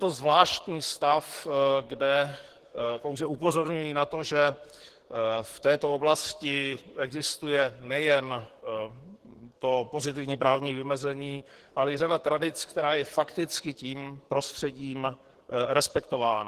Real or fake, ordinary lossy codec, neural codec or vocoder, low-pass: fake; Opus, 16 kbps; codec, 44.1 kHz, 2.6 kbps, SNAC; 14.4 kHz